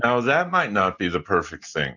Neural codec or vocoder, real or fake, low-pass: none; real; 7.2 kHz